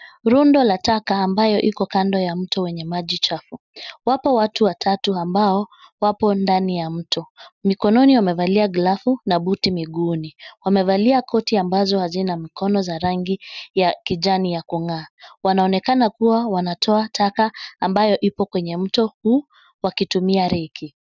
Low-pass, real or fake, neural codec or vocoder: 7.2 kHz; real; none